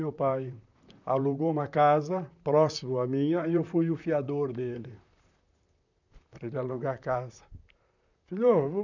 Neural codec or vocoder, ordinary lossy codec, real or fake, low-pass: vocoder, 44.1 kHz, 128 mel bands, Pupu-Vocoder; none; fake; 7.2 kHz